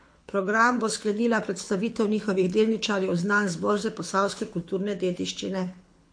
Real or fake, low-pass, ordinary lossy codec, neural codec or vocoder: fake; 9.9 kHz; MP3, 48 kbps; codec, 24 kHz, 6 kbps, HILCodec